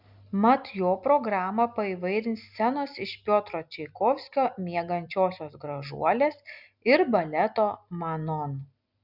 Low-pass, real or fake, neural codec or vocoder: 5.4 kHz; real; none